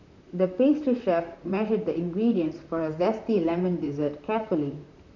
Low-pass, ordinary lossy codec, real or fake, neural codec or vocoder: 7.2 kHz; none; fake; vocoder, 44.1 kHz, 128 mel bands, Pupu-Vocoder